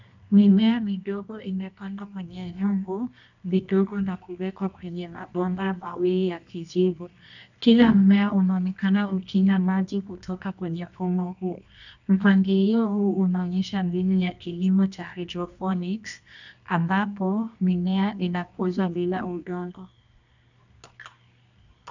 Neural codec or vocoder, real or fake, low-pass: codec, 24 kHz, 0.9 kbps, WavTokenizer, medium music audio release; fake; 7.2 kHz